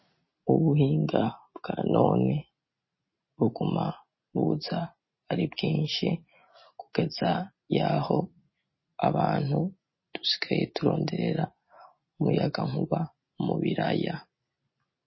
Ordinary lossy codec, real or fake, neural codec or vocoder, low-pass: MP3, 24 kbps; real; none; 7.2 kHz